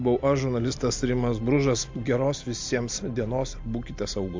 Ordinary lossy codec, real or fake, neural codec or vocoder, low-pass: MP3, 48 kbps; real; none; 7.2 kHz